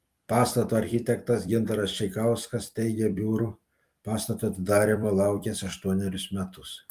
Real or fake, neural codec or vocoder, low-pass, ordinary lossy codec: fake; vocoder, 44.1 kHz, 128 mel bands every 256 samples, BigVGAN v2; 14.4 kHz; Opus, 32 kbps